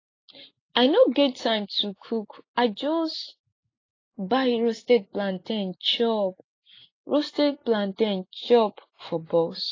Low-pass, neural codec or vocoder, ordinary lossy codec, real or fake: 7.2 kHz; none; AAC, 32 kbps; real